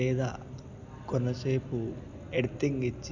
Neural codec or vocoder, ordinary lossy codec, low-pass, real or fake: none; none; 7.2 kHz; real